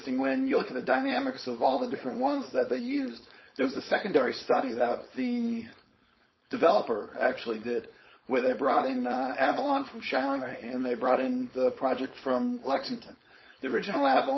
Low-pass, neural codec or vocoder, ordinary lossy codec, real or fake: 7.2 kHz; codec, 16 kHz, 4.8 kbps, FACodec; MP3, 24 kbps; fake